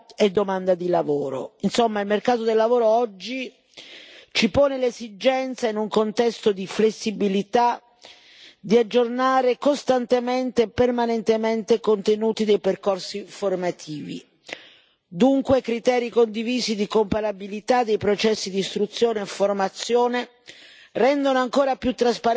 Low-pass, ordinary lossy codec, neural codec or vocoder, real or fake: none; none; none; real